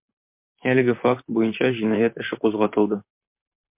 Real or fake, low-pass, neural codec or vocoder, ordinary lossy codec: fake; 3.6 kHz; vocoder, 44.1 kHz, 128 mel bands, Pupu-Vocoder; MP3, 32 kbps